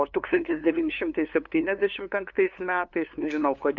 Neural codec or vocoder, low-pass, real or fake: codec, 16 kHz, 2 kbps, FunCodec, trained on LibriTTS, 25 frames a second; 7.2 kHz; fake